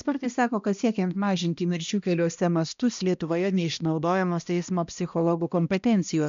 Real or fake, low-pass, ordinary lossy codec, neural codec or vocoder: fake; 7.2 kHz; MP3, 64 kbps; codec, 16 kHz, 1 kbps, X-Codec, HuBERT features, trained on balanced general audio